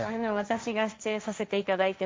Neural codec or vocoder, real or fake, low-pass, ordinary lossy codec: codec, 16 kHz, 1.1 kbps, Voila-Tokenizer; fake; none; none